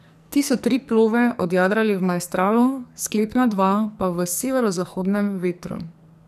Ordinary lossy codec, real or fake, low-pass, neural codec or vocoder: none; fake; 14.4 kHz; codec, 44.1 kHz, 2.6 kbps, SNAC